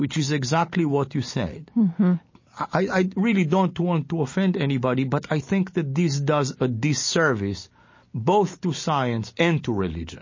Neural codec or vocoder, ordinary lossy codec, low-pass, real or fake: none; MP3, 32 kbps; 7.2 kHz; real